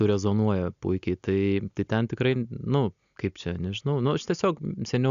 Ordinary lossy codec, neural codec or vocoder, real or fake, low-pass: AAC, 96 kbps; none; real; 7.2 kHz